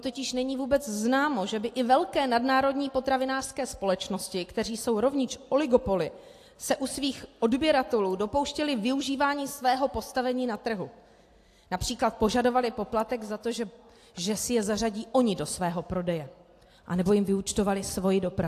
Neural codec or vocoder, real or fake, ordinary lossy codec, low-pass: none; real; AAC, 64 kbps; 14.4 kHz